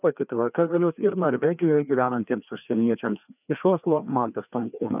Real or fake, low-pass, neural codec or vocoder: fake; 3.6 kHz; codec, 16 kHz, 2 kbps, FreqCodec, larger model